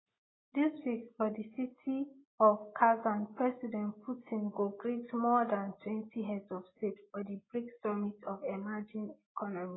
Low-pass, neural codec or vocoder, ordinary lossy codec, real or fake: 7.2 kHz; none; AAC, 16 kbps; real